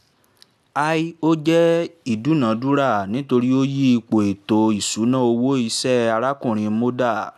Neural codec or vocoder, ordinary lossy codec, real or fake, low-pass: none; none; real; 14.4 kHz